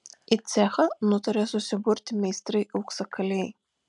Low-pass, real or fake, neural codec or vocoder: 10.8 kHz; real; none